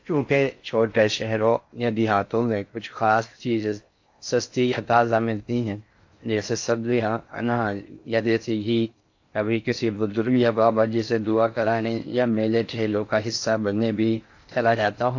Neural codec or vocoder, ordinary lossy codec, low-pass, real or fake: codec, 16 kHz in and 24 kHz out, 0.6 kbps, FocalCodec, streaming, 4096 codes; AAC, 48 kbps; 7.2 kHz; fake